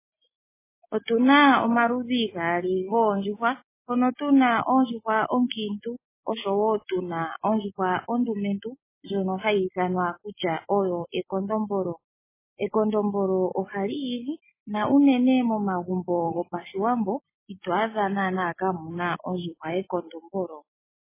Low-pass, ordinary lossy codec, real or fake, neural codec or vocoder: 3.6 kHz; MP3, 16 kbps; real; none